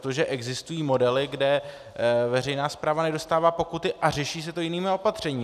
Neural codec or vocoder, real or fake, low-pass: none; real; 14.4 kHz